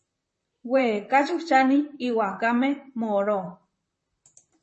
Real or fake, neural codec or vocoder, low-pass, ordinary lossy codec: fake; vocoder, 44.1 kHz, 128 mel bands, Pupu-Vocoder; 10.8 kHz; MP3, 32 kbps